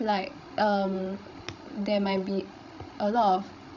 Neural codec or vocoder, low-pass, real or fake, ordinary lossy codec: codec, 16 kHz, 16 kbps, FreqCodec, larger model; 7.2 kHz; fake; none